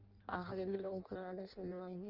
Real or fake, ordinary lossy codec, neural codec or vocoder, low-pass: fake; Opus, 24 kbps; codec, 16 kHz in and 24 kHz out, 0.6 kbps, FireRedTTS-2 codec; 5.4 kHz